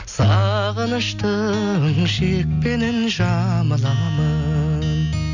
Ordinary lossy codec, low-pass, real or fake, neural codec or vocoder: none; 7.2 kHz; real; none